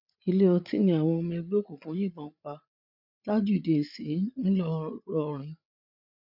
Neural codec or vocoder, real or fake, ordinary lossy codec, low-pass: codec, 16 kHz, 8 kbps, FreqCodec, larger model; fake; none; 5.4 kHz